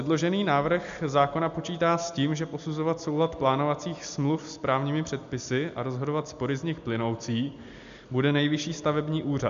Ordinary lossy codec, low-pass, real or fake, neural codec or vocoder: MP3, 64 kbps; 7.2 kHz; real; none